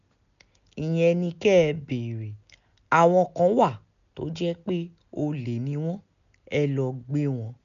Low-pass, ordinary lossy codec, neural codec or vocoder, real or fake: 7.2 kHz; none; none; real